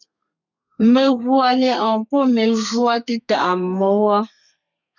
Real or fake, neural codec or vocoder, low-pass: fake; codec, 32 kHz, 1.9 kbps, SNAC; 7.2 kHz